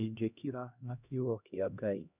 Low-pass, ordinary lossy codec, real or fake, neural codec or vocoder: 3.6 kHz; none; fake; codec, 16 kHz, 1 kbps, X-Codec, HuBERT features, trained on LibriSpeech